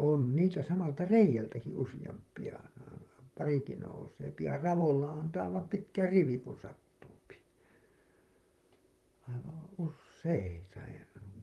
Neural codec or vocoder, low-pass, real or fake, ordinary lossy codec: vocoder, 44.1 kHz, 128 mel bands, Pupu-Vocoder; 19.8 kHz; fake; Opus, 32 kbps